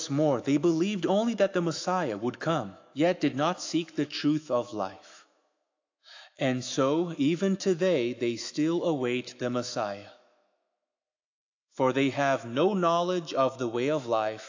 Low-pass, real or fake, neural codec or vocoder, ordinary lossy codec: 7.2 kHz; fake; autoencoder, 48 kHz, 128 numbers a frame, DAC-VAE, trained on Japanese speech; AAC, 48 kbps